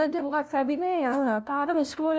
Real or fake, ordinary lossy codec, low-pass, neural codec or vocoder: fake; none; none; codec, 16 kHz, 0.5 kbps, FunCodec, trained on LibriTTS, 25 frames a second